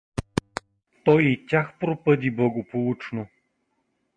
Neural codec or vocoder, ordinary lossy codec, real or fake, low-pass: none; MP3, 48 kbps; real; 9.9 kHz